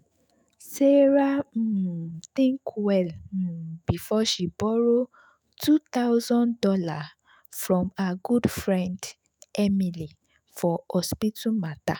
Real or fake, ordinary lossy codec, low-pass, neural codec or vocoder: fake; none; none; autoencoder, 48 kHz, 128 numbers a frame, DAC-VAE, trained on Japanese speech